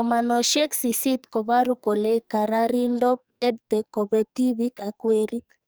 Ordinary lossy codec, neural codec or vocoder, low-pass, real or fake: none; codec, 44.1 kHz, 2.6 kbps, SNAC; none; fake